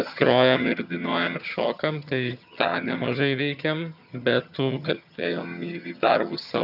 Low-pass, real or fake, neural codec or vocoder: 5.4 kHz; fake; vocoder, 22.05 kHz, 80 mel bands, HiFi-GAN